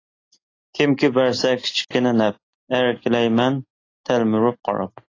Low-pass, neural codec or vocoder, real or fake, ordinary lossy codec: 7.2 kHz; none; real; AAC, 32 kbps